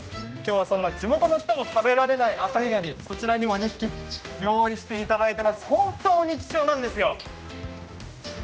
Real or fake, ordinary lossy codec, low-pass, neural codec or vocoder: fake; none; none; codec, 16 kHz, 1 kbps, X-Codec, HuBERT features, trained on general audio